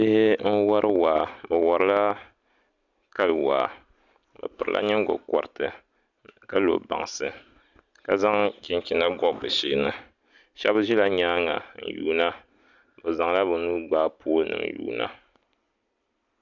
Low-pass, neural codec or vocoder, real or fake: 7.2 kHz; none; real